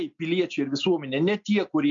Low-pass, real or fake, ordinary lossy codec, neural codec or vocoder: 7.2 kHz; real; MP3, 48 kbps; none